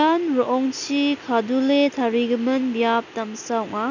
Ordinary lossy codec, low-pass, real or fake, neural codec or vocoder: none; 7.2 kHz; real; none